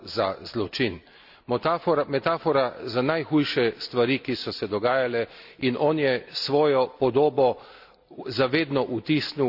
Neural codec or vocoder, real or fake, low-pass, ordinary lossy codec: none; real; 5.4 kHz; none